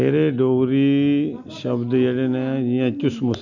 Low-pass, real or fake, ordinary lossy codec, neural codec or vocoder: 7.2 kHz; real; none; none